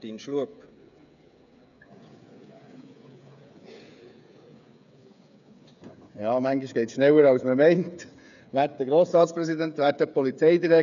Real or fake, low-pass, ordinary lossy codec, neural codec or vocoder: fake; 7.2 kHz; none; codec, 16 kHz, 8 kbps, FreqCodec, smaller model